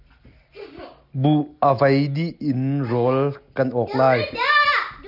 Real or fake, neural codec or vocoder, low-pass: real; none; 5.4 kHz